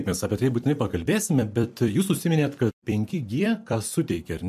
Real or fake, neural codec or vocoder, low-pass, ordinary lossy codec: fake; vocoder, 44.1 kHz, 128 mel bands every 256 samples, BigVGAN v2; 14.4 kHz; MP3, 64 kbps